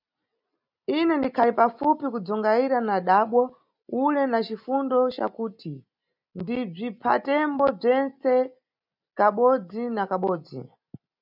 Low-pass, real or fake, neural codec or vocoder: 5.4 kHz; real; none